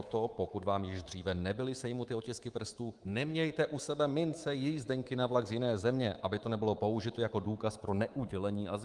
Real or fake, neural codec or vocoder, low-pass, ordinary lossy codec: fake; codec, 24 kHz, 3.1 kbps, DualCodec; 10.8 kHz; Opus, 24 kbps